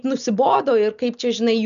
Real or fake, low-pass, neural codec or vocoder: real; 7.2 kHz; none